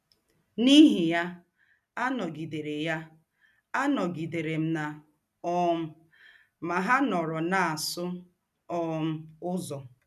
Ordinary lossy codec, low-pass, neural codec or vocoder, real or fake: none; 14.4 kHz; none; real